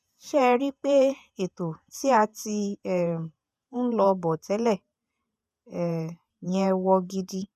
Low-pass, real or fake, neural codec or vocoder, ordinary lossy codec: 14.4 kHz; fake; vocoder, 48 kHz, 128 mel bands, Vocos; none